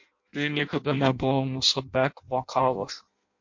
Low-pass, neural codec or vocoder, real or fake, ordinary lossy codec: 7.2 kHz; codec, 16 kHz in and 24 kHz out, 0.6 kbps, FireRedTTS-2 codec; fake; MP3, 48 kbps